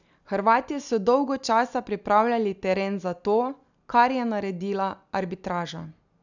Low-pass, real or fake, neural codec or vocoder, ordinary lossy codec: 7.2 kHz; real; none; none